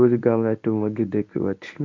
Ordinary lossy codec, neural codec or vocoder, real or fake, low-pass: MP3, 48 kbps; codec, 24 kHz, 0.9 kbps, WavTokenizer, medium speech release version 1; fake; 7.2 kHz